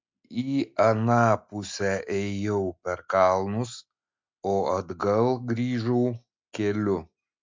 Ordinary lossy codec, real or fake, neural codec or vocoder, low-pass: MP3, 64 kbps; real; none; 7.2 kHz